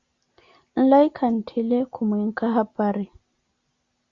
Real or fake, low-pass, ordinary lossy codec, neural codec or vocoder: real; 7.2 kHz; Opus, 64 kbps; none